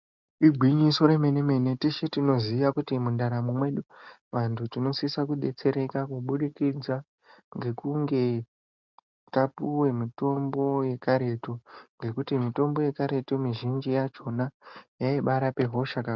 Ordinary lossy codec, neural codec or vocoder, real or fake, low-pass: MP3, 64 kbps; none; real; 7.2 kHz